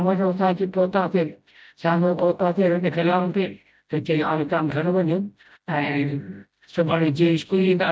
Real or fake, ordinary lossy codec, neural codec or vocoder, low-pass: fake; none; codec, 16 kHz, 0.5 kbps, FreqCodec, smaller model; none